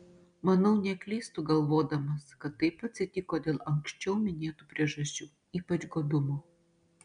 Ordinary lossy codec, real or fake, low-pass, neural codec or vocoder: MP3, 96 kbps; real; 9.9 kHz; none